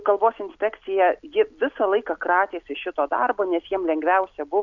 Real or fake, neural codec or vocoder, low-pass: real; none; 7.2 kHz